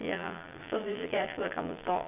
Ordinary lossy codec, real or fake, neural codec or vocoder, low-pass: none; fake; vocoder, 22.05 kHz, 80 mel bands, Vocos; 3.6 kHz